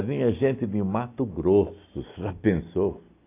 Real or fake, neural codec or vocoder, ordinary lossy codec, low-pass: real; none; AAC, 24 kbps; 3.6 kHz